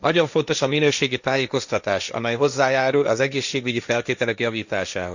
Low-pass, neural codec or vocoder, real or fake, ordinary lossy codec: 7.2 kHz; codec, 16 kHz, 1.1 kbps, Voila-Tokenizer; fake; none